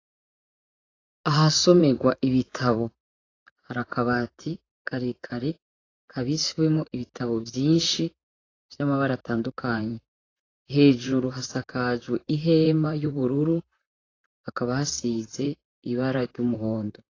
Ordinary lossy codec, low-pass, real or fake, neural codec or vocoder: AAC, 32 kbps; 7.2 kHz; fake; vocoder, 44.1 kHz, 128 mel bands, Pupu-Vocoder